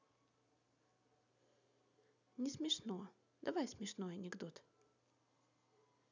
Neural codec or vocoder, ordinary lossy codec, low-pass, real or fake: none; none; 7.2 kHz; real